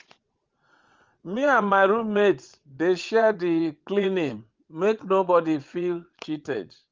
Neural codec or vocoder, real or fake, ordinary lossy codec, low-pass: vocoder, 22.05 kHz, 80 mel bands, WaveNeXt; fake; Opus, 32 kbps; 7.2 kHz